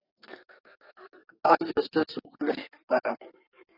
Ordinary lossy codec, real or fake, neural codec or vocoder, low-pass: AAC, 24 kbps; fake; codec, 32 kHz, 1.9 kbps, SNAC; 5.4 kHz